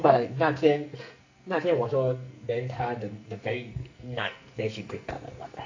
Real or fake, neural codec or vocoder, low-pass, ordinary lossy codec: fake; codec, 44.1 kHz, 2.6 kbps, SNAC; 7.2 kHz; AAC, 48 kbps